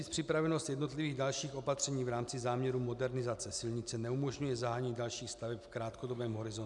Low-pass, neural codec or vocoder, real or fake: 10.8 kHz; none; real